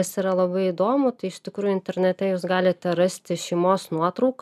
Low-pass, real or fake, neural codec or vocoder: 14.4 kHz; real; none